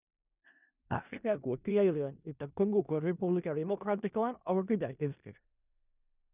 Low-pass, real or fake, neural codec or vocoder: 3.6 kHz; fake; codec, 16 kHz in and 24 kHz out, 0.4 kbps, LongCat-Audio-Codec, four codebook decoder